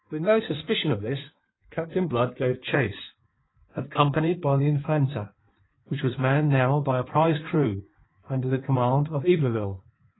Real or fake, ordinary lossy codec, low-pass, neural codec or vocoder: fake; AAC, 16 kbps; 7.2 kHz; codec, 16 kHz in and 24 kHz out, 1.1 kbps, FireRedTTS-2 codec